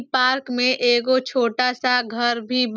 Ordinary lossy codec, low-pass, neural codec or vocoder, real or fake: none; none; none; real